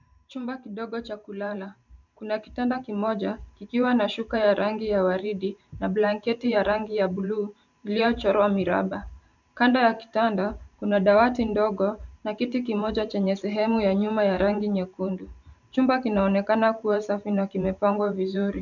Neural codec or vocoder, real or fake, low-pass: vocoder, 44.1 kHz, 128 mel bands every 512 samples, BigVGAN v2; fake; 7.2 kHz